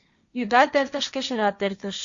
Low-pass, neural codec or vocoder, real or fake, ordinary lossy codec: 7.2 kHz; codec, 16 kHz, 1.1 kbps, Voila-Tokenizer; fake; Opus, 64 kbps